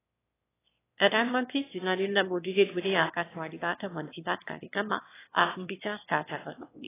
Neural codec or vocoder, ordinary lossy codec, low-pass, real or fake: autoencoder, 22.05 kHz, a latent of 192 numbers a frame, VITS, trained on one speaker; AAC, 16 kbps; 3.6 kHz; fake